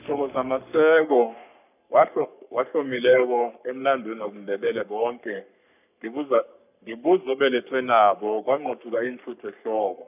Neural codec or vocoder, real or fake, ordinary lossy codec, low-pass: codec, 44.1 kHz, 3.4 kbps, Pupu-Codec; fake; none; 3.6 kHz